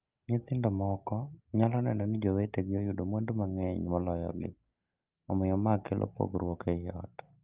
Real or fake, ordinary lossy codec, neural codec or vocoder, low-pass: real; Opus, 24 kbps; none; 3.6 kHz